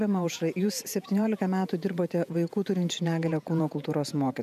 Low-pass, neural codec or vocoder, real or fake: 14.4 kHz; vocoder, 48 kHz, 128 mel bands, Vocos; fake